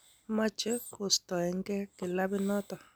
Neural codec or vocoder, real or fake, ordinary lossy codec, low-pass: none; real; none; none